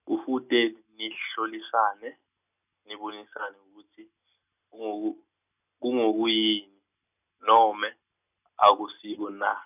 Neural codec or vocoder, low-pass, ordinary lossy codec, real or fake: none; 3.6 kHz; none; real